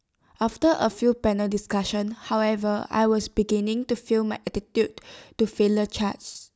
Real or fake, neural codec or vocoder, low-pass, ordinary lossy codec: real; none; none; none